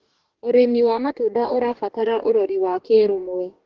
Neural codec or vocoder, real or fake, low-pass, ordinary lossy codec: codec, 44.1 kHz, 2.6 kbps, DAC; fake; 7.2 kHz; Opus, 16 kbps